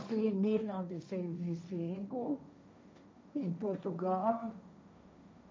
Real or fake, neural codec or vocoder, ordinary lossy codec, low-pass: fake; codec, 16 kHz, 1.1 kbps, Voila-Tokenizer; none; none